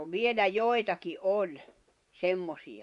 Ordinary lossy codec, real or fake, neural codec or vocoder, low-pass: none; fake; vocoder, 24 kHz, 100 mel bands, Vocos; 10.8 kHz